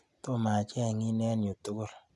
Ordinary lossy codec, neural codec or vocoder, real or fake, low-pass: none; none; real; none